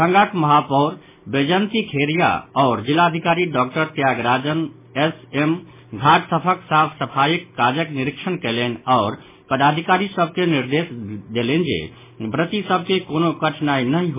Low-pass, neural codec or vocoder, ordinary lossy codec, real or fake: 3.6 kHz; none; MP3, 16 kbps; real